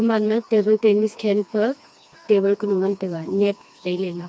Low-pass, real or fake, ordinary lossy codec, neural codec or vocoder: none; fake; none; codec, 16 kHz, 2 kbps, FreqCodec, smaller model